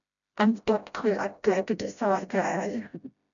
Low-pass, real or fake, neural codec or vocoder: 7.2 kHz; fake; codec, 16 kHz, 0.5 kbps, FreqCodec, smaller model